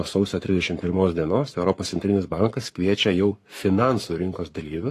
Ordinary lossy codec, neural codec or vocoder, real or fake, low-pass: AAC, 48 kbps; codec, 44.1 kHz, 7.8 kbps, Pupu-Codec; fake; 14.4 kHz